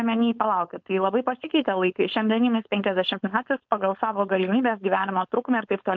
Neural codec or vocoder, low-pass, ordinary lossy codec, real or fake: codec, 16 kHz, 4.8 kbps, FACodec; 7.2 kHz; MP3, 64 kbps; fake